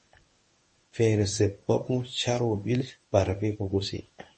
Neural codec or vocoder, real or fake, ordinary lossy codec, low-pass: codec, 24 kHz, 0.9 kbps, WavTokenizer, medium speech release version 1; fake; MP3, 32 kbps; 10.8 kHz